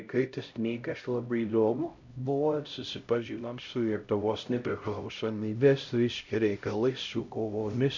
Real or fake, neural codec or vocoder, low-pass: fake; codec, 16 kHz, 0.5 kbps, X-Codec, HuBERT features, trained on LibriSpeech; 7.2 kHz